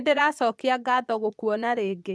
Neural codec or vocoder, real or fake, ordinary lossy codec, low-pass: vocoder, 22.05 kHz, 80 mel bands, Vocos; fake; none; none